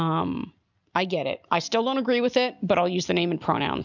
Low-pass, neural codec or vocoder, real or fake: 7.2 kHz; none; real